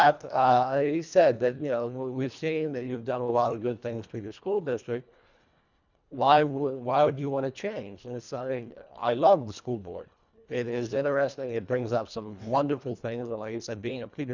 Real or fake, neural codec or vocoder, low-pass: fake; codec, 24 kHz, 1.5 kbps, HILCodec; 7.2 kHz